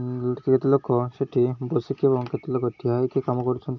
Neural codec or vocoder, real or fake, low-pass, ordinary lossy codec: none; real; 7.2 kHz; none